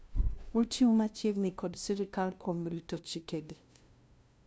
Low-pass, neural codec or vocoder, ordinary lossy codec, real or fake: none; codec, 16 kHz, 0.5 kbps, FunCodec, trained on LibriTTS, 25 frames a second; none; fake